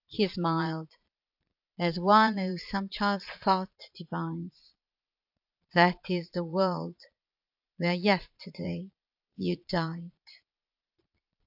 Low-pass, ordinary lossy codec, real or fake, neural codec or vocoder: 5.4 kHz; AAC, 48 kbps; fake; vocoder, 22.05 kHz, 80 mel bands, Vocos